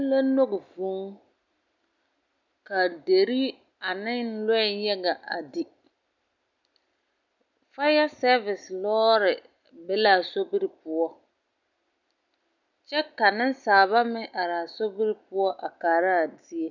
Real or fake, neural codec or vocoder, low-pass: real; none; 7.2 kHz